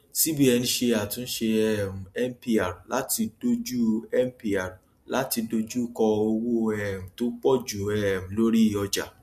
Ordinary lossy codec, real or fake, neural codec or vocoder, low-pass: MP3, 64 kbps; real; none; 14.4 kHz